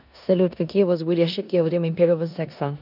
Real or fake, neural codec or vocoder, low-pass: fake; codec, 16 kHz in and 24 kHz out, 0.9 kbps, LongCat-Audio-Codec, four codebook decoder; 5.4 kHz